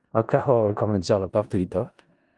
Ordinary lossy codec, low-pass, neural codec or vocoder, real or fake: Opus, 24 kbps; 10.8 kHz; codec, 16 kHz in and 24 kHz out, 0.4 kbps, LongCat-Audio-Codec, four codebook decoder; fake